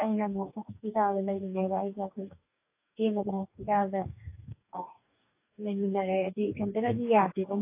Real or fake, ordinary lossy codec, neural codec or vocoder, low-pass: fake; AAC, 24 kbps; codec, 44.1 kHz, 2.6 kbps, DAC; 3.6 kHz